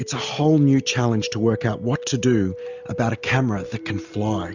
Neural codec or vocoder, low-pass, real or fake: none; 7.2 kHz; real